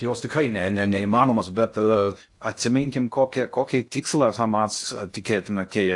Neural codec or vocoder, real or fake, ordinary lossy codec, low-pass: codec, 16 kHz in and 24 kHz out, 0.6 kbps, FocalCodec, streaming, 2048 codes; fake; AAC, 64 kbps; 10.8 kHz